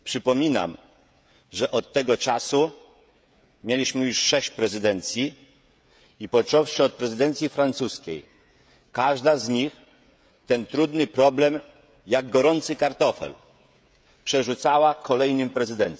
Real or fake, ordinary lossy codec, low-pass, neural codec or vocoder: fake; none; none; codec, 16 kHz, 16 kbps, FreqCodec, smaller model